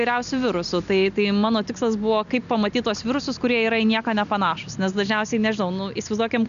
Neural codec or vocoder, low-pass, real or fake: none; 7.2 kHz; real